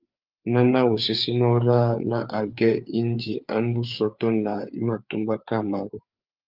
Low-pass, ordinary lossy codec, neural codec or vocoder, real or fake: 5.4 kHz; Opus, 24 kbps; codec, 16 kHz, 4 kbps, FreqCodec, larger model; fake